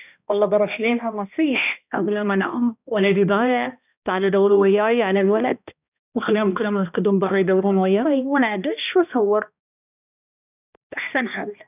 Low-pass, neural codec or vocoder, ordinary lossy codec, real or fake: 3.6 kHz; codec, 16 kHz, 1 kbps, X-Codec, HuBERT features, trained on balanced general audio; none; fake